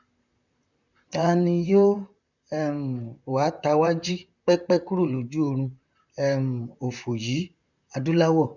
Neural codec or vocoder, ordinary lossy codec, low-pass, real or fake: vocoder, 44.1 kHz, 128 mel bands, Pupu-Vocoder; none; 7.2 kHz; fake